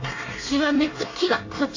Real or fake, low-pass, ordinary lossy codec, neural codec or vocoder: fake; 7.2 kHz; AAC, 48 kbps; codec, 24 kHz, 1 kbps, SNAC